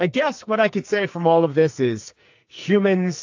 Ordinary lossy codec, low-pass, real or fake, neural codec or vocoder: AAC, 48 kbps; 7.2 kHz; fake; codec, 44.1 kHz, 2.6 kbps, SNAC